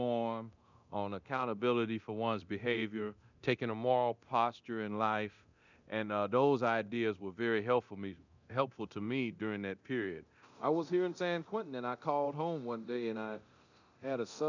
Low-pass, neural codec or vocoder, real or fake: 7.2 kHz; codec, 24 kHz, 0.9 kbps, DualCodec; fake